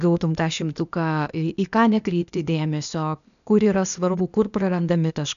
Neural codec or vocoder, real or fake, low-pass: codec, 16 kHz, 0.8 kbps, ZipCodec; fake; 7.2 kHz